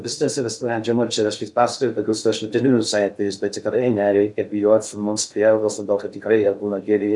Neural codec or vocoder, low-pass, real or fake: codec, 16 kHz in and 24 kHz out, 0.6 kbps, FocalCodec, streaming, 2048 codes; 10.8 kHz; fake